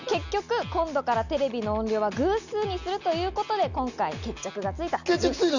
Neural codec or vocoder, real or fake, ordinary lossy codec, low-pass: none; real; none; 7.2 kHz